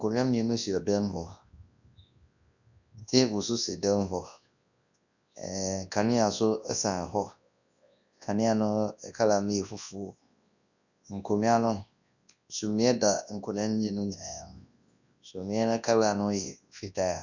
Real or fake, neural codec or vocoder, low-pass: fake; codec, 24 kHz, 0.9 kbps, WavTokenizer, large speech release; 7.2 kHz